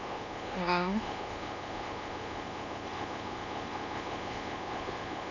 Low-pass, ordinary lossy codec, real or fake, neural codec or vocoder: 7.2 kHz; none; fake; codec, 24 kHz, 1.2 kbps, DualCodec